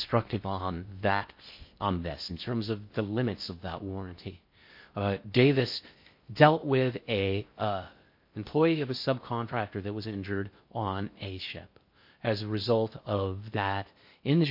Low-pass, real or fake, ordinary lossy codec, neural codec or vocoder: 5.4 kHz; fake; MP3, 32 kbps; codec, 16 kHz in and 24 kHz out, 0.6 kbps, FocalCodec, streaming, 4096 codes